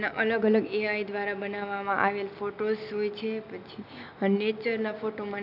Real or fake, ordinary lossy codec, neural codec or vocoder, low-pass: real; AAC, 48 kbps; none; 5.4 kHz